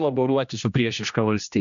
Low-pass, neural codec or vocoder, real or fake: 7.2 kHz; codec, 16 kHz, 1 kbps, X-Codec, HuBERT features, trained on general audio; fake